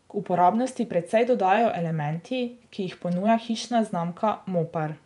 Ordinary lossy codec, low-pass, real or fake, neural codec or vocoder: none; 10.8 kHz; fake; vocoder, 24 kHz, 100 mel bands, Vocos